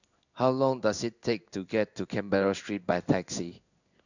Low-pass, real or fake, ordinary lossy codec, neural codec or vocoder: 7.2 kHz; fake; none; codec, 16 kHz in and 24 kHz out, 1 kbps, XY-Tokenizer